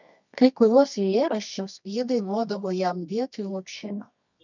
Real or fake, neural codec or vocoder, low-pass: fake; codec, 24 kHz, 0.9 kbps, WavTokenizer, medium music audio release; 7.2 kHz